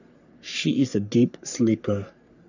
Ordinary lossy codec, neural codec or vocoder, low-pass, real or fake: none; codec, 44.1 kHz, 3.4 kbps, Pupu-Codec; 7.2 kHz; fake